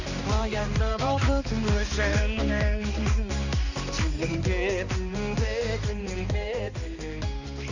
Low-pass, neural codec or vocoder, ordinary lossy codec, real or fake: 7.2 kHz; codec, 16 kHz, 2 kbps, X-Codec, HuBERT features, trained on balanced general audio; none; fake